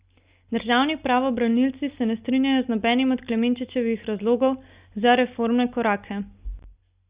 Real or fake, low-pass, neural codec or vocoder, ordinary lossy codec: real; 3.6 kHz; none; Opus, 64 kbps